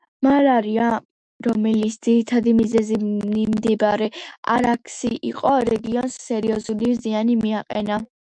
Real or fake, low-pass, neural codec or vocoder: fake; 9.9 kHz; autoencoder, 48 kHz, 128 numbers a frame, DAC-VAE, trained on Japanese speech